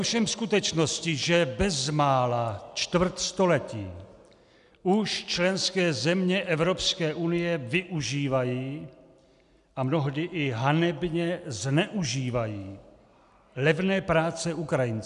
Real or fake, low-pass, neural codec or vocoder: real; 10.8 kHz; none